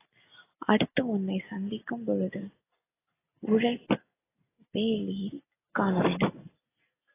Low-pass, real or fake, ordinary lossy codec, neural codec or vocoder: 3.6 kHz; real; AAC, 16 kbps; none